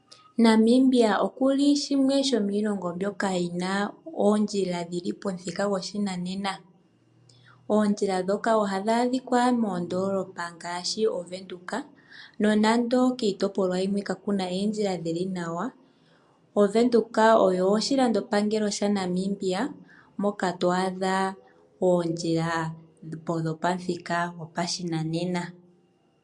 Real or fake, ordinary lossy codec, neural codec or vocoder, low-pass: real; MP3, 64 kbps; none; 10.8 kHz